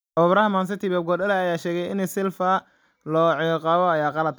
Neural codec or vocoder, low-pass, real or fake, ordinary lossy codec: none; none; real; none